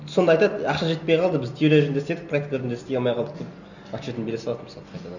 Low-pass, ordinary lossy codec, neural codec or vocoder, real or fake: 7.2 kHz; none; none; real